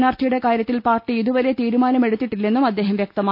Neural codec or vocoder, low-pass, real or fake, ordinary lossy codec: none; 5.4 kHz; real; none